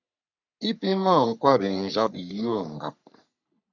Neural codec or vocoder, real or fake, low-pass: codec, 44.1 kHz, 3.4 kbps, Pupu-Codec; fake; 7.2 kHz